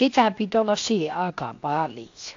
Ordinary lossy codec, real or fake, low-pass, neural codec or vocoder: AAC, 48 kbps; fake; 7.2 kHz; codec, 16 kHz, 0.7 kbps, FocalCodec